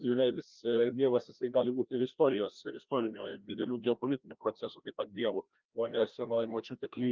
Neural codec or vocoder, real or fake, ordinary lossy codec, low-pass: codec, 16 kHz, 1 kbps, FreqCodec, larger model; fake; Opus, 24 kbps; 7.2 kHz